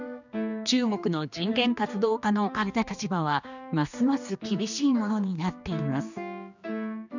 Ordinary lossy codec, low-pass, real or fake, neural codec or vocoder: none; 7.2 kHz; fake; codec, 16 kHz, 2 kbps, X-Codec, HuBERT features, trained on general audio